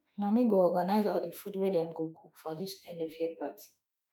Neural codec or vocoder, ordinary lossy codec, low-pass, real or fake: autoencoder, 48 kHz, 32 numbers a frame, DAC-VAE, trained on Japanese speech; none; none; fake